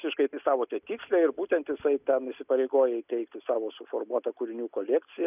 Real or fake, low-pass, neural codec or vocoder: real; 3.6 kHz; none